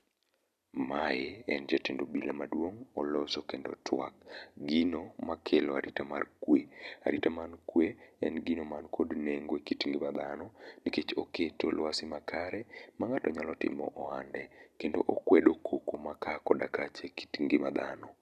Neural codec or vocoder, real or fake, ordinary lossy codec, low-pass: vocoder, 44.1 kHz, 128 mel bands every 256 samples, BigVGAN v2; fake; none; 14.4 kHz